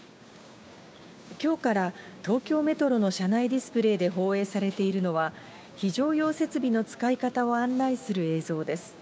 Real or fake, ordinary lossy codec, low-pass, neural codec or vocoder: fake; none; none; codec, 16 kHz, 6 kbps, DAC